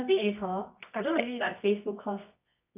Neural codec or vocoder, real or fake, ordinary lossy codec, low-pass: codec, 24 kHz, 0.9 kbps, WavTokenizer, medium music audio release; fake; AAC, 32 kbps; 3.6 kHz